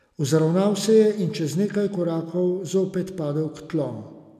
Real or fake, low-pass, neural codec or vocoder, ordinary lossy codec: real; 14.4 kHz; none; none